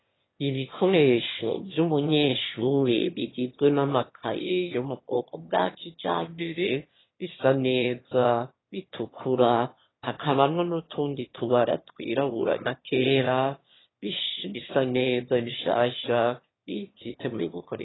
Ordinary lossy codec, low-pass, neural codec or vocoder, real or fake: AAC, 16 kbps; 7.2 kHz; autoencoder, 22.05 kHz, a latent of 192 numbers a frame, VITS, trained on one speaker; fake